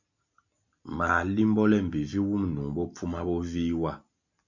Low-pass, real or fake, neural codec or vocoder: 7.2 kHz; real; none